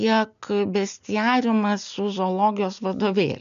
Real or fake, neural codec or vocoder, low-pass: real; none; 7.2 kHz